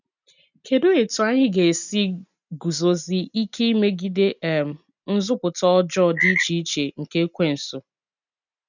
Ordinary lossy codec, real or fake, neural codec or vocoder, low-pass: none; real; none; 7.2 kHz